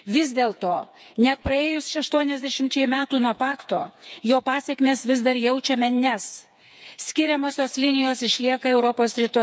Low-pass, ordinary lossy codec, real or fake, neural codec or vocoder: none; none; fake; codec, 16 kHz, 4 kbps, FreqCodec, smaller model